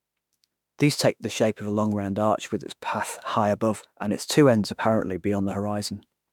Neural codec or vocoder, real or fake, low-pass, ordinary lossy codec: autoencoder, 48 kHz, 32 numbers a frame, DAC-VAE, trained on Japanese speech; fake; 19.8 kHz; none